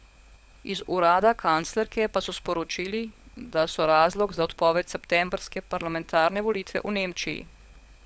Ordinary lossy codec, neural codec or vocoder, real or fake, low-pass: none; codec, 16 kHz, 16 kbps, FunCodec, trained on LibriTTS, 50 frames a second; fake; none